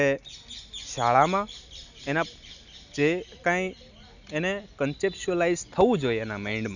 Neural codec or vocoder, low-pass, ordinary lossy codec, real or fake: none; 7.2 kHz; none; real